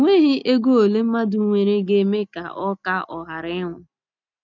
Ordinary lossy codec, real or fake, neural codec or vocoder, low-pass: none; real; none; 7.2 kHz